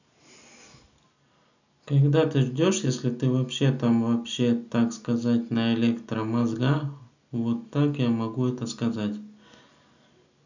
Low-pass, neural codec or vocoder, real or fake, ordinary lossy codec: 7.2 kHz; none; real; none